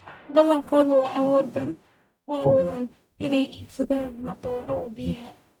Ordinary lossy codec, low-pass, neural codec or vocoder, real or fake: none; 19.8 kHz; codec, 44.1 kHz, 0.9 kbps, DAC; fake